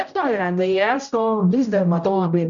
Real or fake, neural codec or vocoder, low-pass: fake; codec, 16 kHz, 0.5 kbps, X-Codec, HuBERT features, trained on general audio; 7.2 kHz